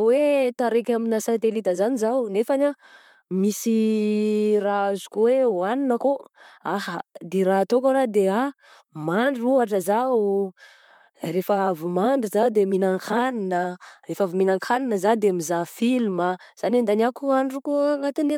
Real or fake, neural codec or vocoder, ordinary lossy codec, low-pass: fake; vocoder, 44.1 kHz, 128 mel bands, Pupu-Vocoder; MP3, 96 kbps; 19.8 kHz